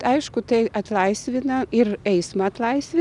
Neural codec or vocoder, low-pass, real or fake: none; 10.8 kHz; real